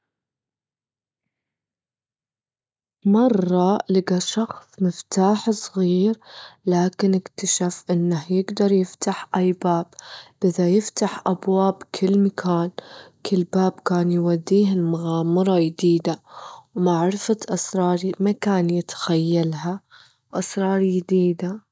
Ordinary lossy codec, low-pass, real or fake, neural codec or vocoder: none; none; real; none